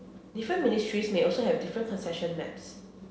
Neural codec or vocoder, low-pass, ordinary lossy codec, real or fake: none; none; none; real